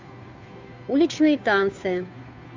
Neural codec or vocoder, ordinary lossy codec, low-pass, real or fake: codec, 16 kHz, 2 kbps, FunCodec, trained on Chinese and English, 25 frames a second; MP3, 64 kbps; 7.2 kHz; fake